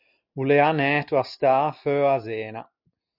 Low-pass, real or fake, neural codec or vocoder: 5.4 kHz; real; none